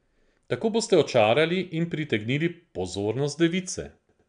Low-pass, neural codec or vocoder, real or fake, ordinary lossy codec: 9.9 kHz; none; real; none